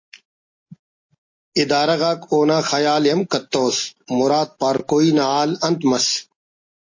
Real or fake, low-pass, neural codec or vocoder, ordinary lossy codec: real; 7.2 kHz; none; MP3, 32 kbps